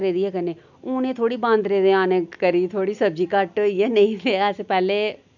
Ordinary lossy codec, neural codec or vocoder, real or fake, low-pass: none; none; real; 7.2 kHz